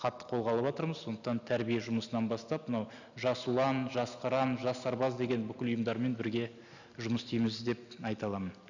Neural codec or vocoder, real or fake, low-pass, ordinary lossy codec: none; real; 7.2 kHz; none